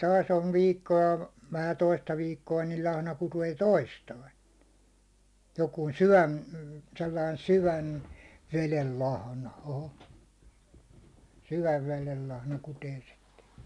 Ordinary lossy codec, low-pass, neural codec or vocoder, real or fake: none; none; none; real